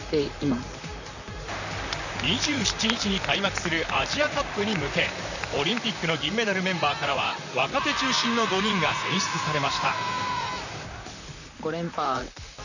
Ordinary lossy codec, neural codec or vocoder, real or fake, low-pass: none; vocoder, 44.1 kHz, 128 mel bands, Pupu-Vocoder; fake; 7.2 kHz